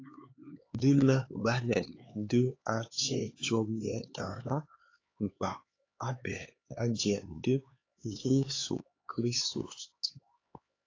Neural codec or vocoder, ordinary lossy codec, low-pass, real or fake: codec, 16 kHz, 4 kbps, X-Codec, HuBERT features, trained on LibriSpeech; AAC, 32 kbps; 7.2 kHz; fake